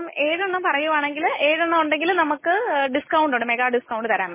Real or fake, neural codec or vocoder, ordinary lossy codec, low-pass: real; none; MP3, 16 kbps; 3.6 kHz